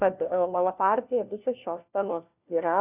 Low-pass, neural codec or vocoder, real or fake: 3.6 kHz; codec, 16 kHz, 1 kbps, FunCodec, trained on LibriTTS, 50 frames a second; fake